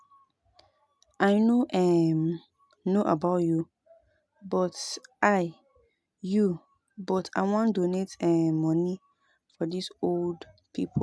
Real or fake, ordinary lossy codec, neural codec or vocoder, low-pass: real; none; none; none